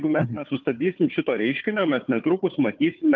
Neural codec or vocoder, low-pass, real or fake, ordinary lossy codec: codec, 16 kHz, 4 kbps, FunCodec, trained on Chinese and English, 50 frames a second; 7.2 kHz; fake; Opus, 24 kbps